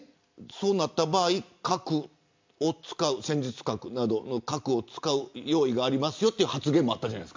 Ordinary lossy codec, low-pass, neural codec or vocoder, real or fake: MP3, 64 kbps; 7.2 kHz; none; real